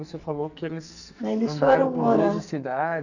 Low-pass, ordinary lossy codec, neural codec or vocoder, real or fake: 7.2 kHz; none; codec, 44.1 kHz, 2.6 kbps, SNAC; fake